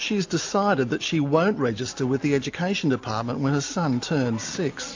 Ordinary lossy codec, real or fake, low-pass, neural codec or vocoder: AAC, 48 kbps; real; 7.2 kHz; none